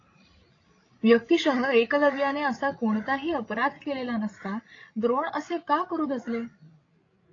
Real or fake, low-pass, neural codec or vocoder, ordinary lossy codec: fake; 7.2 kHz; codec, 16 kHz, 16 kbps, FreqCodec, larger model; AAC, 32 kbps